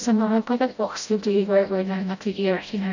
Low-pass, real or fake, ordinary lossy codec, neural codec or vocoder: 7.2 kHz; fake; none; codec, 16 kHz, 0.5 kbps, FreqCodec, smaller model